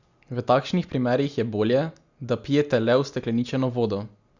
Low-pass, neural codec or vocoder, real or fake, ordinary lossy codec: 7.2 kHz; none; real; Opus, 64 kbps